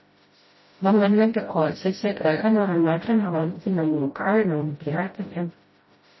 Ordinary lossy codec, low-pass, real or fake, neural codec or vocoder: MP3, 24 kbps; 7.2 kHz; fake; codec, 16 kHz, 0.5 kbps, FreqCodec, smaller model